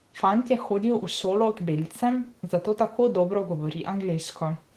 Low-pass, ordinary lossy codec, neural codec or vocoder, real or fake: 14.4 kHz; Opus, 16 kbps; autoencoder, 48 kHz, 128 numbers a frame, DAC-VAE, trained on Japanese speech; fake